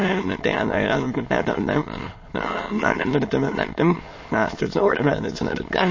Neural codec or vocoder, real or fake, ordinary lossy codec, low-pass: autoencoder, 22.05 kHz, a latent of 192 numbers a frame, VITS, trained on many speakers; fake; MP3, 32 kbps; 7.2 kHz